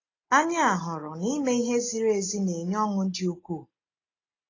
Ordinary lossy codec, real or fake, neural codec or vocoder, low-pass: AAC, 32 kbps; real; none; 7.2 kHz